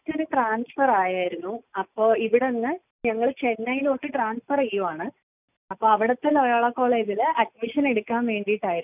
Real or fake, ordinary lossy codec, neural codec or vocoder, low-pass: real; none; none; 3.6 kHz